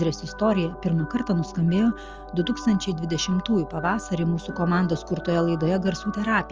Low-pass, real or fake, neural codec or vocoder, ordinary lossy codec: 7.2 kHz; real; none; Opus, 24 kbps